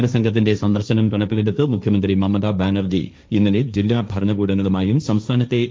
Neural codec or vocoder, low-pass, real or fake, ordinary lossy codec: codec, 16 kHz, 1.1 kbps, Voila-Tokenizer; none; fake; none